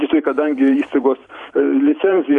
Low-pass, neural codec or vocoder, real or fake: 10.8 kHz; vocoder, 44.1 kHz, 128 mel bands every 512 samples, BigVGAN v2; fake